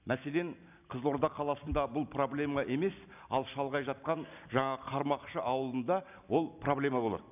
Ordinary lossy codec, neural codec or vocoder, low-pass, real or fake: none; none; 3.6 kHz; real